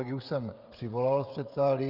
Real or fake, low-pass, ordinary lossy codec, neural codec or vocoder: fake; 5.4 kHz; Opus, 32 kbps; codec, 16 kHz, 16 kbps, FreqCodec, smaller model